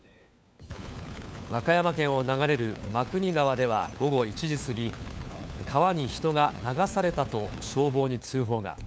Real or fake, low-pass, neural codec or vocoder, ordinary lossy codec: fake; none; codec, 16 kHz, 4 kbps, FunCodec, trained on LibriTTS, 50 frames a second; none